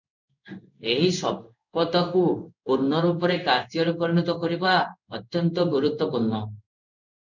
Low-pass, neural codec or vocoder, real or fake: 7.2 kHz; codec, 16 kHz in and 24 kHz out, 1 kbps, XY-Tokenizer; fake